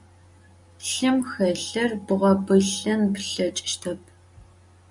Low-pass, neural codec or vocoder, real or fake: 10.8 kHz; none; real